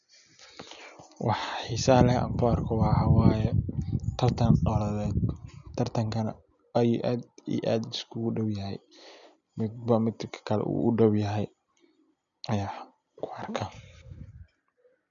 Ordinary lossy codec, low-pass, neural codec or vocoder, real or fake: none; 7.2 kHz; none; real